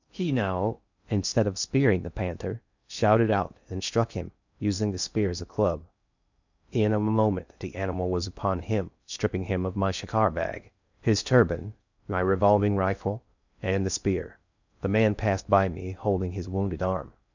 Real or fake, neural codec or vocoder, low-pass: fake; codec, 16 kHz in and 24 kHz out, 0.6 kbps, FocalCodec, streaming, 2048 codes; 7.2 kHz